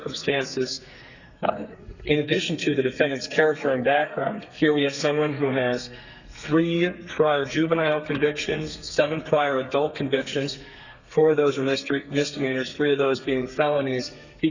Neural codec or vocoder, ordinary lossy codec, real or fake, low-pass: codec, 32 kHz, 1.9 kbps, SNAC; Opus, 64 kbps; fake; 7.2 kHz